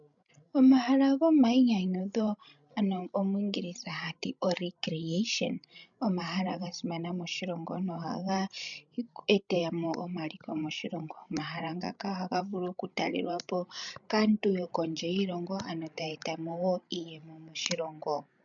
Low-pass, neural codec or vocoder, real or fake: 7.2 kHz; codec, 16 kHz, 16 kbps, FreqCodec, larger model; fake